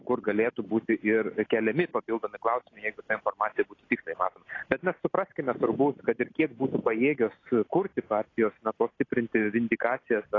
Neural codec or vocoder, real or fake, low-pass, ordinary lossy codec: none; real; 7.2 kHz; AAC, 32 kbps